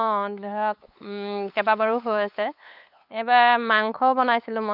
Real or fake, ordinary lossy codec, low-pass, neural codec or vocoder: fake; none; 5.4 kHz; codec, 16 kHz, 8 kbps, FunCodec, trained on LibriTTS, 25 frames a second